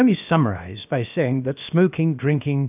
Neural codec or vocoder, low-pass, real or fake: codec, 16 kHz, about 1 kbps, DyCAST, with the encoder's durations; 3.6 kHz; fake